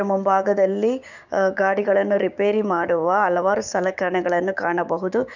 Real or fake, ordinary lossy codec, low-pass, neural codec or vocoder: fake; none; 7.2 kHz; codec, 16 kHz, 6 kbps, DAC